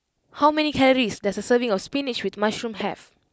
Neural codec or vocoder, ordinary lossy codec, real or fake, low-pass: none; none; real; none